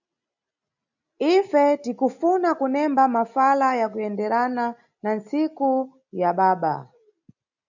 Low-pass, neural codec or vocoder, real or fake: 7.2 kHz; none; real